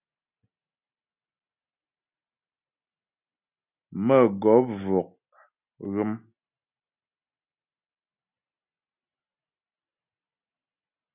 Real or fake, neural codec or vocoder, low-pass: real; none; 3.6 kHz